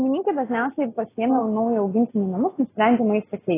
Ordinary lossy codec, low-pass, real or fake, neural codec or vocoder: AAC, 16 kbps; 3.6 kHz; real; none